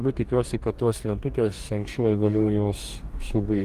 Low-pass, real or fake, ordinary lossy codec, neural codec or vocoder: 14.4 kHz; fake; Opus, 24 kbps; codec, 44.1 kHz, 2.6 kbps, DAC